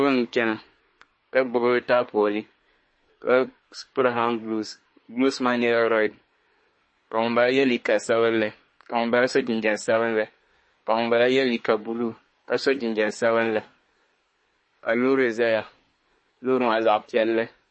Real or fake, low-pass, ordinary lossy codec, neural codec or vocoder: fake; 9.9 kHz; MP3, 32 kbps; codec, 24 kHz, 1 kbps, SNAC